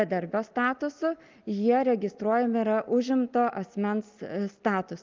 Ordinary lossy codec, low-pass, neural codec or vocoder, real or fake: Opus, 24 kbps; 7.2 kHz; none; real